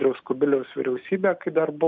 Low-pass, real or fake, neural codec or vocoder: 7.2 kHz; real; none